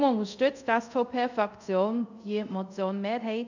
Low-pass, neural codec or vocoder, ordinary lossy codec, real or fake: 7.2 kHz; codec, 24 kHz, 0.5 kbps, DualCodec; none; fake